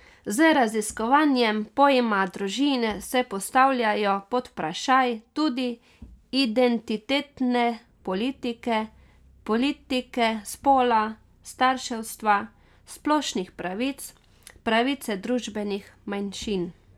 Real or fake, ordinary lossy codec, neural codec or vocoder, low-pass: real; none; none; 19.8 kHz